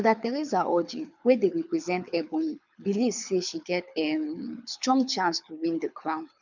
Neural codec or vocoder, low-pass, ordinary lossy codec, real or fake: codec, 24 kHz, 6 kbps, HILCodec; 7.2 kHz; none; fake